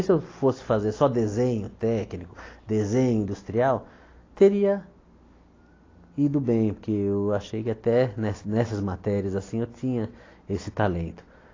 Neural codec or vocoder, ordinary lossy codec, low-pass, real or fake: none; AAC, 32 kbps; 7.2 kHz; real